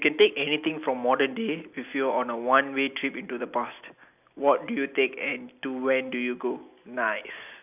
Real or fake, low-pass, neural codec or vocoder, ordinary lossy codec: real; 3.6 kHz; none; none